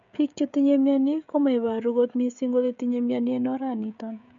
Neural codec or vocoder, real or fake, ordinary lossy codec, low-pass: codec, 16 kHz, 16 kbps, FreqCodec, smaller model; fake; none; 7.2 kHz